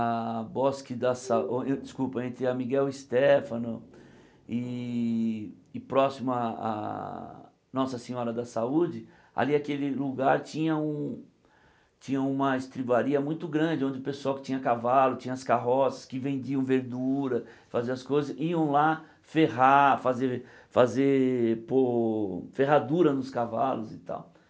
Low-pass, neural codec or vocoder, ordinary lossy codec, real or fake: none; none; none; real